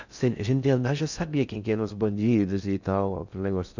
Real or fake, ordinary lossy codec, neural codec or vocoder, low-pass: fake; none; codec, 16 kHz in and 24 kHz out, 0.6 kbps, FocalCodec, streaming, 2048 codes; 7.2 kHz